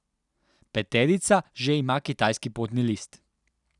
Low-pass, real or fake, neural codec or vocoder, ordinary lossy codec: 10.8 kHz; real; none; none